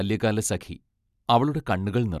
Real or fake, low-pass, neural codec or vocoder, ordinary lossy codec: real; 14.4 kHz; none; none